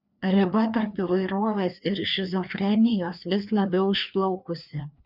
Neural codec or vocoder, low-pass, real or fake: codec, 16 kHz, 2 kbps, FreqCodec, larger model; 5.4 kHz; fake